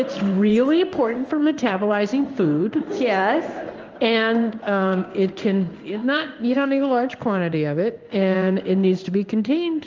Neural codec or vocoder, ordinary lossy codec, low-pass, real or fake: codec, 16 kHz in and 24 kHz out, 1 kbps, XY-Tokenizer; Opus, 24 kbps; 7.2 kHz; fake